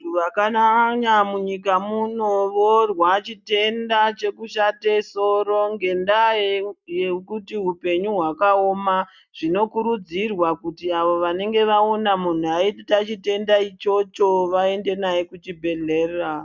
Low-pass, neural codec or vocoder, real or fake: 7.2 kHz; none; real